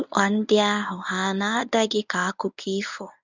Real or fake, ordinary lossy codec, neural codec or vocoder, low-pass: fake; none; codec, 24 kHz, 0.9 kbps, WavTokenizer, medium speech release version 1; 7.2 kHz